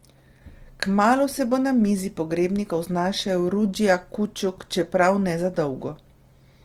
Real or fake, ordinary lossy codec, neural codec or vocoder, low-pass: real; Opus, 24 kbps; none; 19.8 kHz